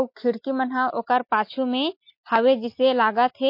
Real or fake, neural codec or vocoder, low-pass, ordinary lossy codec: real; none; 5.4 kHz; MP3, 32 kbps